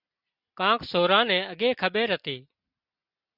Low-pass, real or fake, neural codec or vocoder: 5.4 kHz; real; none